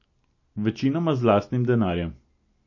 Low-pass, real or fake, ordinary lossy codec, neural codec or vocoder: 7.2 kHz; real; MP3, 32 kbps; none